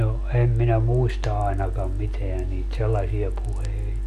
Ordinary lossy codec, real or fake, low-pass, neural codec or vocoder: MP3, 64 kbps; real; 14.4 kHz; none